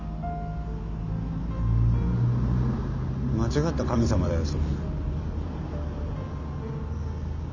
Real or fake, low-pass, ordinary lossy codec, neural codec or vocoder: real; 7.2 kHz; none; none